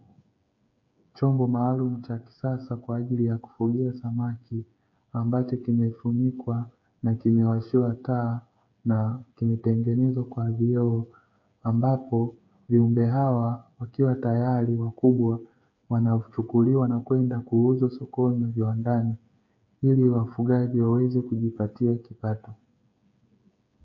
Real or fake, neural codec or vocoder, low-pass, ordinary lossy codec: fake; codec, 16 kHz, 8 kbps, FreqCodec, smaller model; 7.2 kHz; MP3, 48 kbps